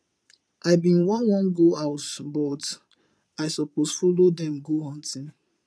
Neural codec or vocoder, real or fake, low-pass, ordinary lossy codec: vocoder, 22.05 kHz, 80 mel bands, Vocos; fake; none; none